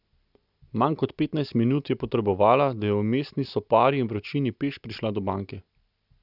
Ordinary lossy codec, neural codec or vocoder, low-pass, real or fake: none; none; 5.4 kHz; real